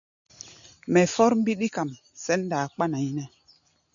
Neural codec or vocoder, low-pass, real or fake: none; 7.2 kHz; real